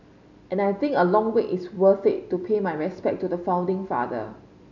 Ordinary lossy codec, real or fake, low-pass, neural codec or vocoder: none; real; 7.2 kHz; none